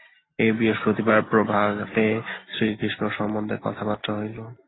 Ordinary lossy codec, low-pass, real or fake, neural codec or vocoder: AAC, 16 kbps; 7.2 kHz; real; none